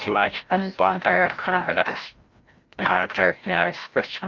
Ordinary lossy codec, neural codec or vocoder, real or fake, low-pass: Opus, 24 kbps; codec, 16 kHz, 0.5 kbps, FreqCodec, larger model; fake; 7.2 kHz